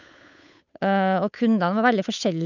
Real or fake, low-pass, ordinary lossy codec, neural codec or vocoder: fake; 7.2 kHz; none; codec, 16 kHz, 8 kbps, FunCodec, trained on Chinese and English, 25 frames a second